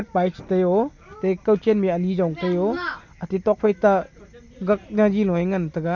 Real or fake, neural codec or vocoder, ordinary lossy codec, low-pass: real; none; none; 7.2 kHz